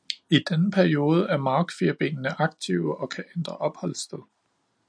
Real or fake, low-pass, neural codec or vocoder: real; 9.9 kHz; none